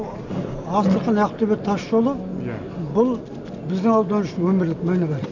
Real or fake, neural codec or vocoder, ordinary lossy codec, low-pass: real; none; none; 7.2 kHz